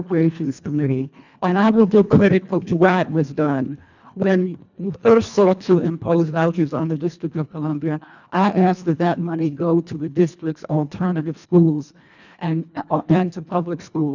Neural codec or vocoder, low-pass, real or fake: codec, 24 kHz, 1.5 kbps, HILCodec; 7.2 kHz; fake